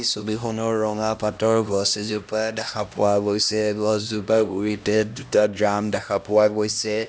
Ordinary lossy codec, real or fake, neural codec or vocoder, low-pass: none; fake; codec, 16 kHz, 1 kbps, X-Codec, HuBERT features, trained on LibriSpeech; none